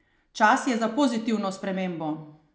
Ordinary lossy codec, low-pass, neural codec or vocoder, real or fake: none; none; none; real